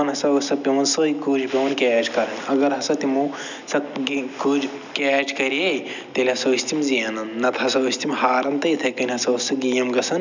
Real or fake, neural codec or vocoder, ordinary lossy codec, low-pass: real; none; none; 7.2 kHz